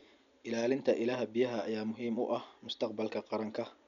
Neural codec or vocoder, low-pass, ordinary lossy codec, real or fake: none; 7.2 kHz; MP3, 96 kbps; real